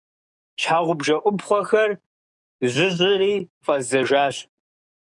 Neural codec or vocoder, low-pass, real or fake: codec, 44.1 kHz, 7.8 kbps, DAC; 10.8 kHz; fake